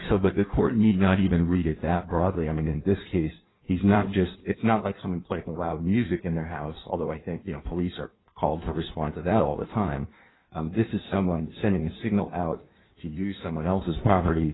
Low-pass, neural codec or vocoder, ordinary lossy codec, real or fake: 7.2 kHz; codec, 16 kHz in and 24 kHz out, 1.1 kbps, FireRedTTS-2 codec; AAC, 16 kbps; fake